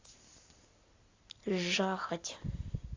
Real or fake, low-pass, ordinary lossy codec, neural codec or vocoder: real; 7.2 kHz; AAC, 32 kbps; none